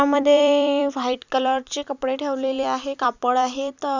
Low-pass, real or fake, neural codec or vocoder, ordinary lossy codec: 7.2 kHz; fake; vocoder, 44.1 kHz, 128 mel bands every 256 samples, BigVGAN v2; none